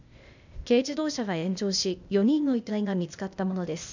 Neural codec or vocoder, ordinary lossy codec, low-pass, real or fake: codec, 16 kHz, 0.8 kbps, ZipCodec; none; 7.2 kHz; fake